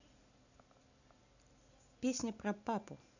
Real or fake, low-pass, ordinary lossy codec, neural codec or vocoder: real; 7.2 kHz; none; none